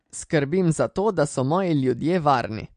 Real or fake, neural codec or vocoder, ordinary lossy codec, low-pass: real; none; MP3, 48 kbps; 9.9 kHz